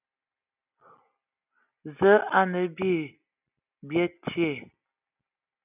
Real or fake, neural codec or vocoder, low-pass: real; none; 3.6 kHz